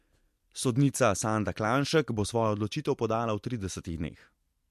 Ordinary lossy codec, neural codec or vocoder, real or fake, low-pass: MP3, 64 kbps; none; real; 14.4 kHz